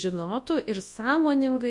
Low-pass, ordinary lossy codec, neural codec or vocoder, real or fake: 10.8 kHz; MP3, 64 kbps; codec, 24 kHz, 0.9 kbps, WavTokenizer, large speech release; fake